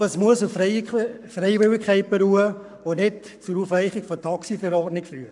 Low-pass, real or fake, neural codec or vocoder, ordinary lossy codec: 10.8 kHz; fake; codec, 44.1 kHz, 7.8 kbps, Pupu-Codec; none